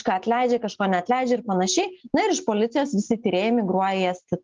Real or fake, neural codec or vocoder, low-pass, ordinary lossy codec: real; none; 7.2 kHz; Opus, 32 kbps